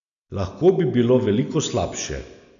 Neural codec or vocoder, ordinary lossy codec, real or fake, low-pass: none; none; real; 7.2 kHz